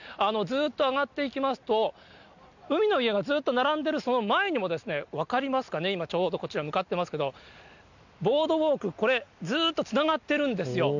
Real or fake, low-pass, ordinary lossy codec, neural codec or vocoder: real; 7.2 kHz; none; none